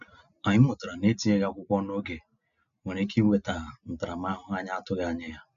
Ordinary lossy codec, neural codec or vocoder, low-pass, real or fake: none; none; 7.2 kHz; real